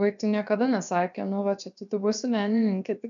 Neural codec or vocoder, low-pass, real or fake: codec, 16 kHz, about 1 kbps, DyCAST, with the encoder's durations; 7.2 kHz; fake